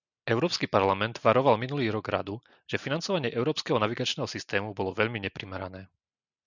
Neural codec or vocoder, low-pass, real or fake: none; 7.2 kHz; real